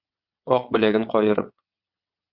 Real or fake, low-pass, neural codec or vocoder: fake; 5.4 kHz; vocoder, 22.05 kHz, 80 mel bands, WaveNeXt